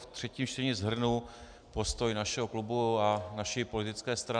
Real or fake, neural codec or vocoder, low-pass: real; none; 9.9 kHz